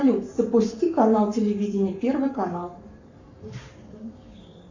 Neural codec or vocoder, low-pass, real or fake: codec, 44.1 kHz, 7.8 kbps, Pupu-Codec; 7.2 kHz; fake